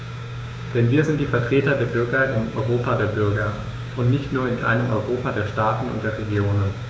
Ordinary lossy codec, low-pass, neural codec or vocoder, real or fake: none; none; codec, 16 kHz, 6 kbps, DAC; fake